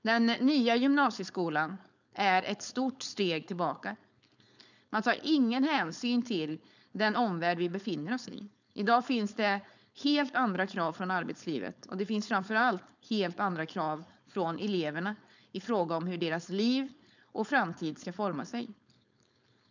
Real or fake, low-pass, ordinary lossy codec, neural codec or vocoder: fake; 7.2 kHz; none; codec, 16 kHz, 4.8 kbps, FACodec